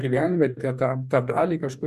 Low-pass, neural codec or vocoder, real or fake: 14.4 kHz; codec, 44.1 kHz, 2.6 kbps, DAC; fake